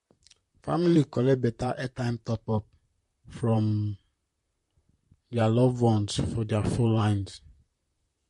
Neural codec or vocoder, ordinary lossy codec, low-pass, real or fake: vocoder, 44.1 kHz, 128 mel bands, Pupu-Vocoder; MP3, 48 kbps; 14.4 kHz; fake